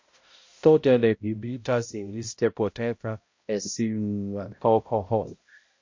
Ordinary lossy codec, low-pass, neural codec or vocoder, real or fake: MP3, 48 kbps; 7.2 kHz; codec, 16 kHz, 0.5 kbps, X-Codec, HuBERT features, trained on balanced general audio; fake